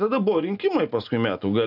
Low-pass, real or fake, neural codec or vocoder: 5.4 kHz; real; none